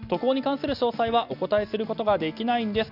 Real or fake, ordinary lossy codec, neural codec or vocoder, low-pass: real; none; none; 5.4 kHz